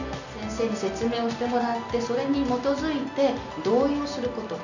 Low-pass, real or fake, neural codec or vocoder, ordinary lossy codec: 7.2 kHz; real; none; none